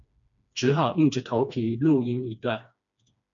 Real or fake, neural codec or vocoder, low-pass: fake; codec, 16 kHz, 2 kbps, FreqCodec, smaller model; 7.2 kHz